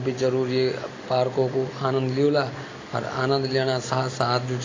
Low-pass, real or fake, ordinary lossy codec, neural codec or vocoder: 7.2 kHz; real; AAC, 32 kbps; none